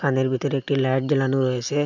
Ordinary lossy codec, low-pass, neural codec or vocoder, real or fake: none; 7.2 kHz; none; real